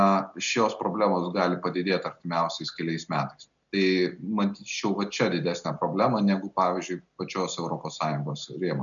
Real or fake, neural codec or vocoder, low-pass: real; none; 7.2 kHz